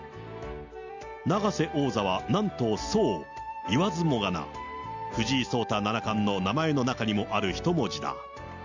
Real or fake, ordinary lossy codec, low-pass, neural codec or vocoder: real; none; 7.2 kHz; none